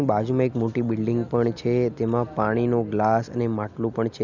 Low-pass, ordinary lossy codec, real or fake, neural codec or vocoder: 7.2 kHz; none; real; none